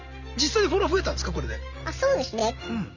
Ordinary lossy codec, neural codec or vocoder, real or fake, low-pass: none; none; real; 7.2 kHz